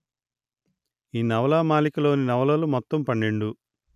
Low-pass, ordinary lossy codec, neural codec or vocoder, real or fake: 14.4 kHz; none; none; real